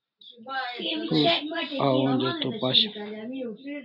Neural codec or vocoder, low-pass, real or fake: none; 5.4 kHz; real